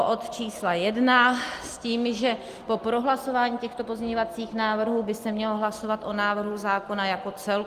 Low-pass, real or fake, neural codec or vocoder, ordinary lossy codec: 14.4 kHz; real; none; Opus, 24 kbps